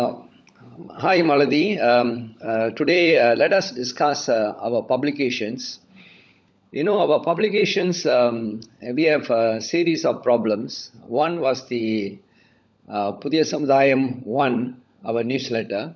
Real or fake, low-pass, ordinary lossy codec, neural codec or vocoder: fake; none; none; codec, 16 kHz, 16 kbps, FunCodec, trained on LibriTTS, 50 frames a second